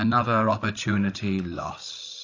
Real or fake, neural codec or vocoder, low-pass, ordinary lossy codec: fake; codec, 16 kHz, 16 kbps, FreqCodec, larger model; 7.2 kHz; AAC, 32 kbps